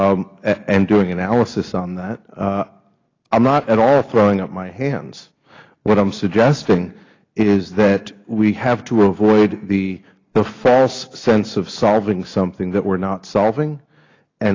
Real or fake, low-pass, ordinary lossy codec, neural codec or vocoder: real; 7.2 kHz; AAC, 32 kbps; none